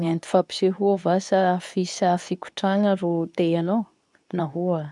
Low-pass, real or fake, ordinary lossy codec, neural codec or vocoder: 10.8 kHz; fake; none; codec, 24 kHz, 0.9 kbps, WavTokenizer, medium speech release version 2